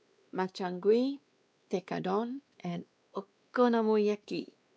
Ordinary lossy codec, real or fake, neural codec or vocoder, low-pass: none; fake; codec, 16 kHz, 2 kbps, X-Codec, WavLM features, trained on Multilingual LibriSpeech; none